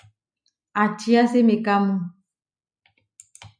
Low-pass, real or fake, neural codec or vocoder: 9.9 kHz; real; none